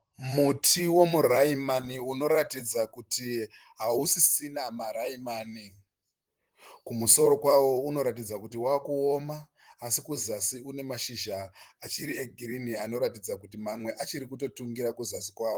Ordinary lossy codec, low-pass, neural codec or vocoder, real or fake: Opus, 24 kbps; 19.8 kHz; vocoder, 44.1 kHz, 128 mel bands, Pupu-Vocoder; fake